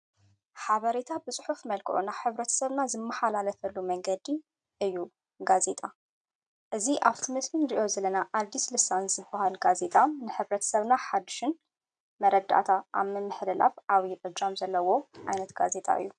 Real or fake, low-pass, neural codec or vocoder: real; 9.9 kHz; none